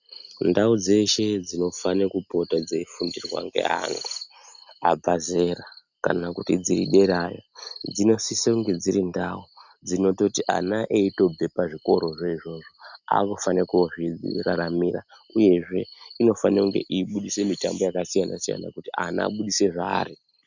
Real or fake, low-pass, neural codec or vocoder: real; 7.2 kHz; none